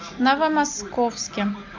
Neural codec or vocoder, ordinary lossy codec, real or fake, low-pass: none; MP3, 64 kbps; real; 7.2 kHz